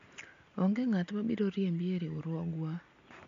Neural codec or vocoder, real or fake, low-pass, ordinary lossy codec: none; real; 7.2 kHz; MP3, 48 kbps